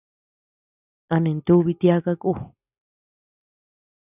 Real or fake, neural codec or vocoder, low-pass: real; none; 3.6 kHz